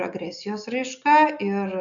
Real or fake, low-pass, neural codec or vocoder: real; 7.2 kHz; none